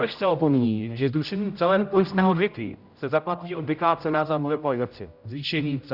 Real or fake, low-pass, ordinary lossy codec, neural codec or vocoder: fake; 5.4 kHz; Opus, 64 kbps; codec, 16 kHz, 0.5 kbps, X-Codec, HuBERT features, trained on general audio